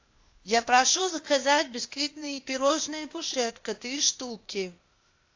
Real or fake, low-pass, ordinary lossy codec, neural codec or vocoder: fake; 7.2 kHz; AAC, 48 kbps; codec, 16 kHz, 0.8 kbps, ZipCodec